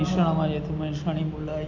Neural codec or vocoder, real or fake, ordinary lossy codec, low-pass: none; real; none; 7.2 kHz